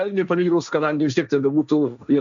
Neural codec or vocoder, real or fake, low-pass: codec, 16 kHz, 1.1 kbps, Voila-Tokenizer; fake; 7.2 kHz